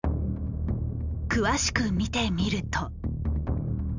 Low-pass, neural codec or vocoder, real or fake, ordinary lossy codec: 7.2 kHz; none; real; none